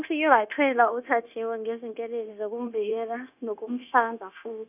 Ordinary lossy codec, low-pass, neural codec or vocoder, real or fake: none; 3.6 kHz; codec, 16 kHz, 0.9 kbps, LongCat-Audio-Codec; fake